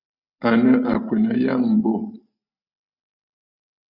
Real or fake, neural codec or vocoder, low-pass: real; none; 5.4 kHz